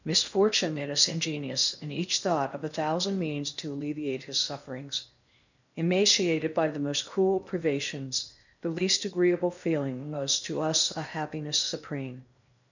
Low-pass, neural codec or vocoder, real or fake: 7.2 kHz; codec, 16 kHz in and 24 kHz out, 0.6 kbps, FocalCodec, streaming, 4096 codes; fake